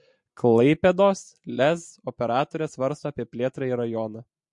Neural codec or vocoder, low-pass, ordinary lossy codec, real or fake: none; 10.8 kHz; MP3, 48 kbps; real